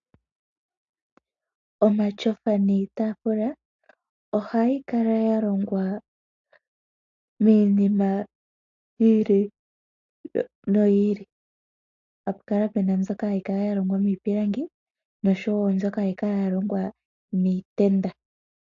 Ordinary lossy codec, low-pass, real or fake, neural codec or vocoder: AAC, 64 kbps; 7.2 kHz; real; none